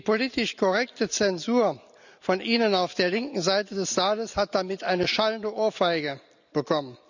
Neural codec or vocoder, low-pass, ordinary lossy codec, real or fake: none; 7.2 kHz; none; real